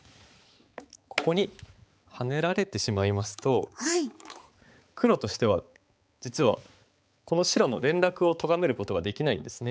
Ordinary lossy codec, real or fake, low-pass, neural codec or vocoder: none; fake; none; codec, 16 kHz, 4 kbps, X-Codec, HuBERT features, trained on balanced general audio